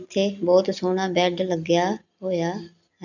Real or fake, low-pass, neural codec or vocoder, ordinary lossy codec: real; 7.2 kHz; none; none